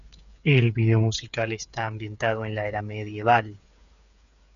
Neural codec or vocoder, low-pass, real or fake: codec, 16 kHz, 8 kbps, FreqCodec, smaller model; 7.2 kHz; fake